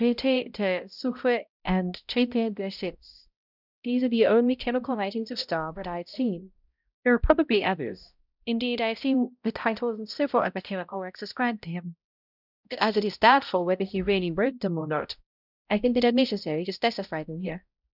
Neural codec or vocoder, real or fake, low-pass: codec, 16 kHz, 0.5 kbps, X-Codec, HuBERT features, trained on balanced general audio; fake; 5.4 kHz